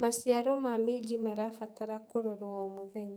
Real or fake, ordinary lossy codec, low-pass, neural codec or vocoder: fake; none; none; codec, 44.1 kHz, 2.6 kbps, SNAC